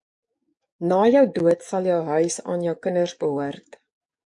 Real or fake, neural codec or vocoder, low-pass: fake; codec, 44.1 kHz, 7.8 kbps, DAC; 10.8 kHz